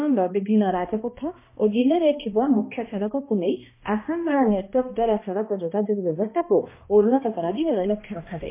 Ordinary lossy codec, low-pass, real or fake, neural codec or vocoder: MP3, 24 kbps; 3.6 kHz; fake; codec, 16 kHz, 1 kbps, X-Codec, HuBERT features, trained on balanced general audio